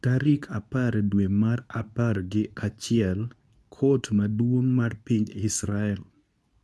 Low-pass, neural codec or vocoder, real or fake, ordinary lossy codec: none; codec, 24 kHz, 0.9 kbps, WavTokenizer, medium speech release version 2; fake; none